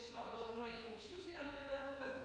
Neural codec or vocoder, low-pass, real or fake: codec, 24 kHz, 1.2 kbps, DualCodec; 9.9 kHz; fake